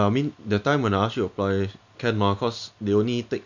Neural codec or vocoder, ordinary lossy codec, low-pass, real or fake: none; none; 7.2 kHz; real